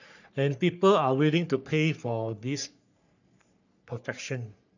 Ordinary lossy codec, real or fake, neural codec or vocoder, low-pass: none; fake; codec, 44.1 kHz, 3.4 kbps, Pupu-Codec; 7.2 kHz